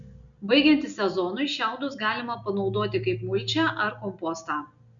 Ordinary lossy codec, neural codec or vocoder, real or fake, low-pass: MP3, 64 kbps; none; real; 7.2 kHz